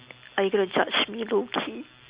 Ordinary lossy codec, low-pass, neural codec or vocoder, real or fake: Opus, 64 kbps; 3.6 kHz; none; real